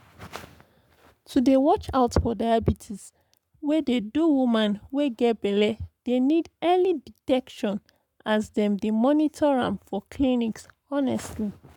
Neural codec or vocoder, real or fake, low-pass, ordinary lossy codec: codec, 44.1 kHz, 7.8 kbps, Pupu-Codec; fake; 19.8 kHz; none